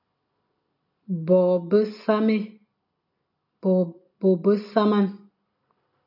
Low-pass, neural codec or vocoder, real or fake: 5.4 kHz; none; real